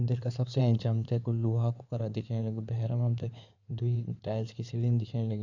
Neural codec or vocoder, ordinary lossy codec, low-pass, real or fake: codec, 16 kHz in and 24 kHz out, 2.2 kbps, FireRedTTS-2 codec; none; 7.2 kHz; fake